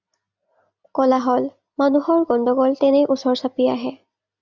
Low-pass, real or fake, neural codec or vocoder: 7.2 kHz; real; none